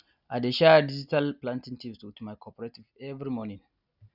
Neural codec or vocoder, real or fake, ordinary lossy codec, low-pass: none; real; none; 5.4 kHz